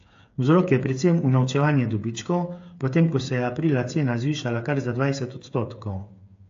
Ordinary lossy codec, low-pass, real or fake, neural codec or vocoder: AAC, 48 kbps; 7.2 kHz; fake; codec, 16 kHz, 8 kbps, FreqCodec, smaller model